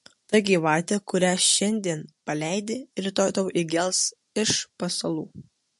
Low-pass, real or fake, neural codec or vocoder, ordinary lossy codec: 14.4 kHz; real; none; MP3, 48 kbps